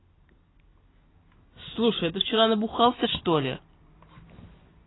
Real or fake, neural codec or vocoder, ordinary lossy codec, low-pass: real; none; AAC, 16 kbps; 7.2 kHz